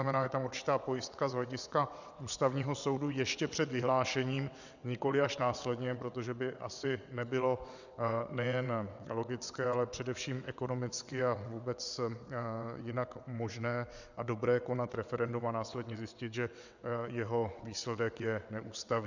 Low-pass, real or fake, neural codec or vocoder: 7.2 kHz; fake; vocoder, 22.05 kHz, 80 mel bands, WaveNeXt